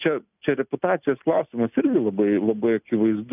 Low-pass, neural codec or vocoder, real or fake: 3.6 kHz; none; real